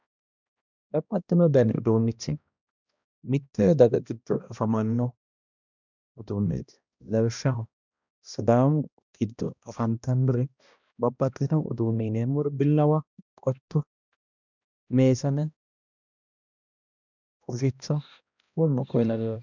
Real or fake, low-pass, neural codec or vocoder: fake; 7.2 kHz; codec, 16 kHz, 1 kbps, X-Codec, HuBERT features, trained on balanced general audio